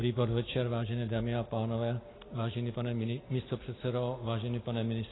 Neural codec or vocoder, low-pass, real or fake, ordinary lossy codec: none; 7.2 kHz; real; AAC, 16 kbps